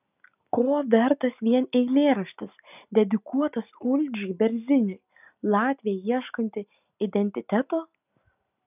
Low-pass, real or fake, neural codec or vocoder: 3.6 kHz; real; none